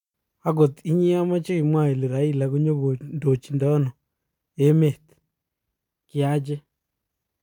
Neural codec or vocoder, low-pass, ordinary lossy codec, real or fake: none; 19.8 kHz; none; real